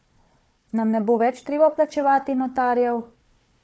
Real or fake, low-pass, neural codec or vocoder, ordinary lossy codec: fake; none; codec, 16 kHz, 4 kbps, FunCodec, trained on Chinese and English, 50 frames a second; none